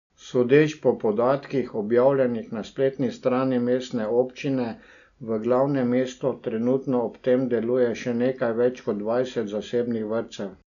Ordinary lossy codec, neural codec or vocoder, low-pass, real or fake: none; none; 7.2 kHz; real